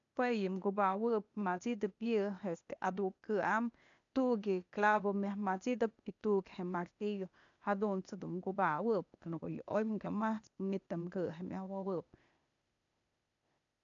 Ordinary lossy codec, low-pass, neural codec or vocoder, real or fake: none; 7.2 kHz; codec, 16 kHz, 0.8 kbps, ZipCodec; fake